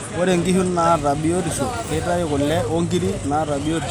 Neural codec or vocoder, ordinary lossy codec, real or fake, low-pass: none; none; real; none